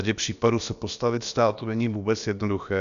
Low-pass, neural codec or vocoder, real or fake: 7.2 kHz; codec, 16 kHz, about 1 kbps, DyCAST, with the encoder's durations; fake